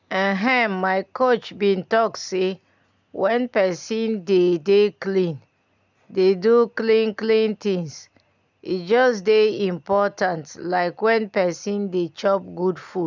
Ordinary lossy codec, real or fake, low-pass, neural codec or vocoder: none; real; 7.2 kHz; none